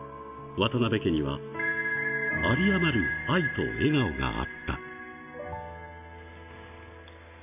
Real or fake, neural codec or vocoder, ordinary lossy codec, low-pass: real; none; none; 3.6 kHz